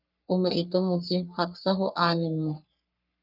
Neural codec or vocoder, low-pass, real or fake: codec, 44.1 kHz, 3.4 kbps, Pupu-Codec; 5.4 kHz; fake